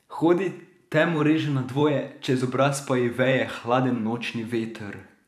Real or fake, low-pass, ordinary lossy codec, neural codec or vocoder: fake; 14.4 kHz; none; vocoder, 44.1 kHz, 128 mel bands every 512 samples, BigVGAN v2